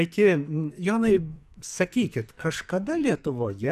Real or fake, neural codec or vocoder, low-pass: fake; codec, 44.1 kHz, 2.6 kbps, SNAC; 14.4 kHz